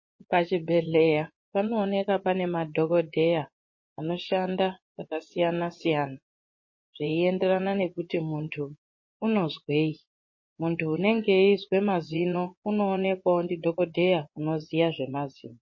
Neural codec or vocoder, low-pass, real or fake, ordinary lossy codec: vocoder, 24 kHz, 100 mel bands, Vocos; 7.2 kHz; fake; MP3, 32 kbps